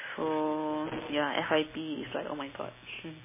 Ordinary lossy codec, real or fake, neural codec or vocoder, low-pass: MP3, 16 kbps; real; none; 3.6 kHz